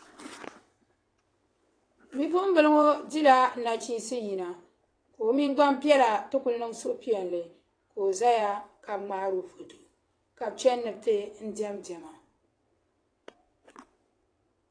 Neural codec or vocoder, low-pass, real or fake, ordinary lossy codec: vocoder, 22.05 kHz, 80 mel bands, WaveNeXt; 9.9 kHz; fake; AAC, 48 kbps